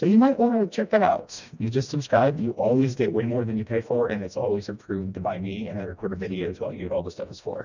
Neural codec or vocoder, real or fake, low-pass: codec, 16 kHz, 1 kbps, FreqCodec, smaller model; fake; 7.2 kHz